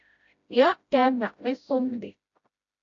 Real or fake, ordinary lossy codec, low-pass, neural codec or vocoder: fake; AAC, 48 kbps; 7.2 kHz; codec, 16 kHz, 0.5 kbps, FreqCodec, smaller model